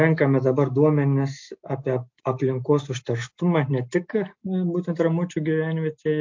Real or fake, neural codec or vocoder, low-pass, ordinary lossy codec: real; none; 7.2 kHz; MP3, 48 kbps